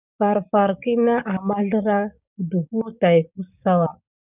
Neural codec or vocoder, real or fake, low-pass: codec, 16 kHz, 6 kbps, DAC; fake; 3.6 kHz